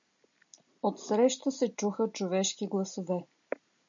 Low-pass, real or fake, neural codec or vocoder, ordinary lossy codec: 7.2 kHz; real; none; MP3, 64 kbps